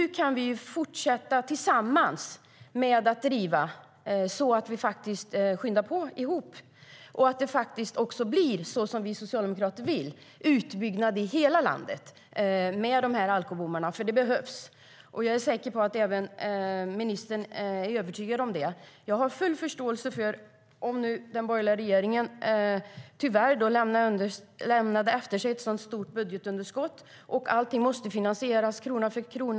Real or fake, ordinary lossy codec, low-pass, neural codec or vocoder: real; none; none; none